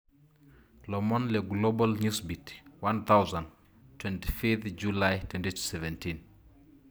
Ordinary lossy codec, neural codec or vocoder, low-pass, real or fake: none; none; none; real